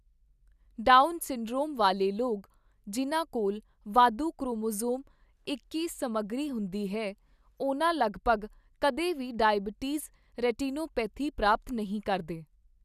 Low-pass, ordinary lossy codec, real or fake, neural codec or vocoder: 14.4 kHz; none; real; none